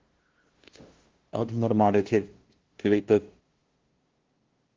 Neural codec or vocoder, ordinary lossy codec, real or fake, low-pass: codec, 16 kHz, 0.5 kbps, FunCodec, trained on LibriTTS, 25 frames a second; Opus, 16 kbps; fake; 7.2 kHz